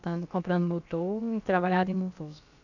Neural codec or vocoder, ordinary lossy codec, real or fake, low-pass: codec, 16 kHz, about 1 kbps, DyCAST, with the encoder's durations; none; fake; 7.2 kHz